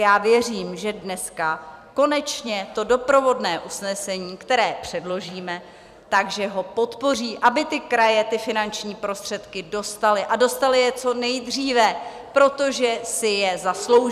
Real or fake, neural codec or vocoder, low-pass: fake; vocoder, 44.1 kHz, 128 mel bands every 256 samples, BigVGAN v2; 14.4 kHz